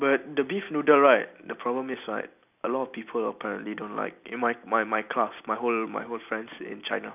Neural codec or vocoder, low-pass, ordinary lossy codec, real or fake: none; 3.6 kHz; none; real